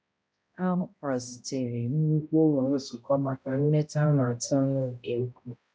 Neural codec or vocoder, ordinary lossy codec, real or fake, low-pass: codec, 16 kHz, 0.5 kbps, X-Codec, HuBERT features, trained on balanced general audio; none; fake; none